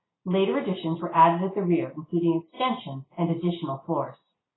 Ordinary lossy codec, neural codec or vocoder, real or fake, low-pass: AAC, 16 kbps; none; real; 7.2 kHz